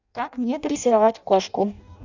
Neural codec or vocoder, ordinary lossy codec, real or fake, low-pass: codec, 16 kHz in and 24 kHz out, 0.6 kbps, FireRedTTS-2 codec; none; fake; 7.2 kHz